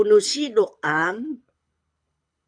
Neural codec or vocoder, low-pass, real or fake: codec, 24 kHz, 6 kbps, HILCodec; 9.9 kHz; fake